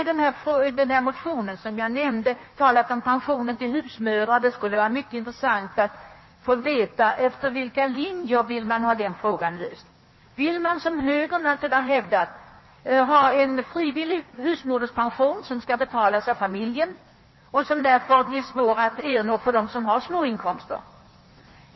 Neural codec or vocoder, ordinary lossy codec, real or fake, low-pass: codec, 16 kHz in and 24 kHz out, 1.1 kbps, FireRedTTS-2 codec; MP3, 24 kbps; fake; 7.2 kHz